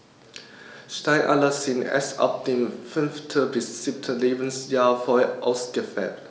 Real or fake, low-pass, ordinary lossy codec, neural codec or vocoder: real; none; none; none